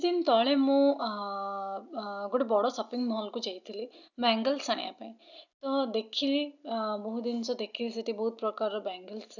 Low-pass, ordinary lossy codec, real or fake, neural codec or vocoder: 7.2 kHz; none; real; none